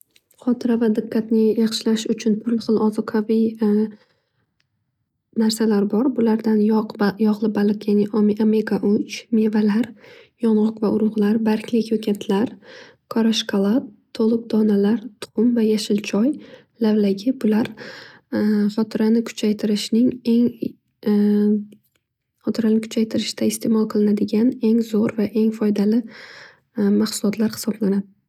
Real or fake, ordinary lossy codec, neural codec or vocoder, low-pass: real; none; none; 19.8 kHz